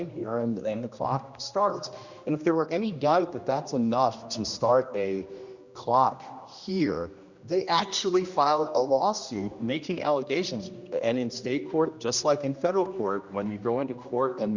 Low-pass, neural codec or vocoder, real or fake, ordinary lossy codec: 7.2 kHz; codec, 16 kHz, 1 kbps, X-Codec, HuBERT features, trained on general audio; fake; Opus, 64 kbps